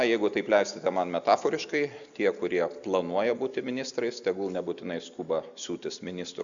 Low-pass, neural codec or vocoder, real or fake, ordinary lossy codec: 7.2 kHz; none; real; MP3, 96 kbps